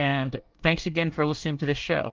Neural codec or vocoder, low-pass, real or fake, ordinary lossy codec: codec, 24 kHz, 1 kbps, SNAC; 7.2 kHz; fake; Opus, 32 kbps